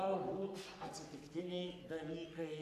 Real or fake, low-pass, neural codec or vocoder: fake; 14.4 kHz; codec, 44.1 kHz, 3.4 kbps, Pupu-Codec